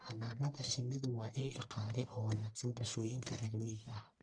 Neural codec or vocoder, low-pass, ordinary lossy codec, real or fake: codec, 44.1 kHz, 1.7 kbps, Pupu-Codec; 9.9 kHz; MP3, 96 kbps; fake